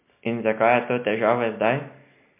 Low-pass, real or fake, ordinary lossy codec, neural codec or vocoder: 3.6 kHz; real; MP3, 32 kbps; none